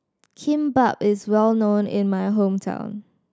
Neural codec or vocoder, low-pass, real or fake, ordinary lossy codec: none; none; real; none